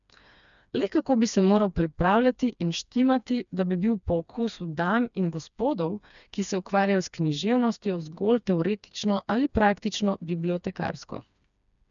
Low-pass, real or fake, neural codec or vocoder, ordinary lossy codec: 7.2 kHz; fake; codec, 16 kHz, 2 kbps, FreqCodec, smaller model; none